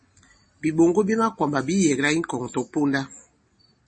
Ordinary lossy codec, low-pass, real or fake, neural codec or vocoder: MP3, 32 kbps; 10.8 kHz; real; none